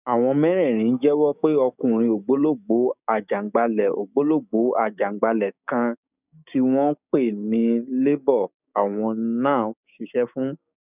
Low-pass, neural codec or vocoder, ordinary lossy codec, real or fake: 3.6 kHz; codec, 16 kHz, 6 kbps, DAC; none; fake